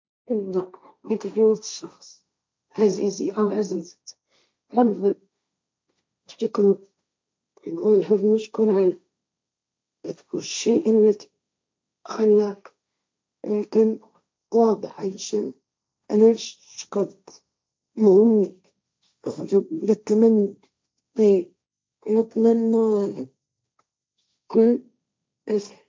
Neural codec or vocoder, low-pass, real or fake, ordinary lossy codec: codec, 16 kHz, 1.1 kbps, Voila-Tokenizer; none; fake; none